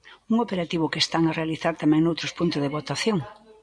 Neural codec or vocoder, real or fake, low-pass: none; real; 9.9 kHz